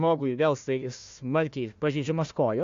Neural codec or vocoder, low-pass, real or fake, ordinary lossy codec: codec, 16 kHz, 1 kbps, FunCodec, trained on Chinese and English, 50 frames a second; 7.2 kHz; fake; AAC, 48 kbps